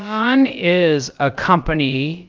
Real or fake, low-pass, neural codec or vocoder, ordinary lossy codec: fake; 7.2 kHz; codec, 16 kHz, about 1 kbps, DyCAST, with the encoder's durations; Opus, 32 kbps